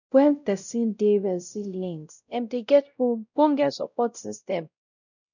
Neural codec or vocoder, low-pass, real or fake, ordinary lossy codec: codec, 16 kHz, 0.5 kbps, X-Codec, WavLM features, trained on Multilingual LibriSpeech; 7.2 kHz; fake; none